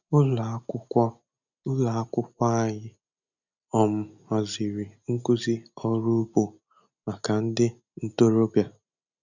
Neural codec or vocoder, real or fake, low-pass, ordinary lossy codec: none; real; 7.2 kHz; none